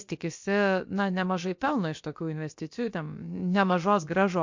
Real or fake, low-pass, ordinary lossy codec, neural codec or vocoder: fake; 7.2 kHz; MP3, 48 kbps; codec, 16 kHz, about 1 kbps, DyCAST, with the encoder's durations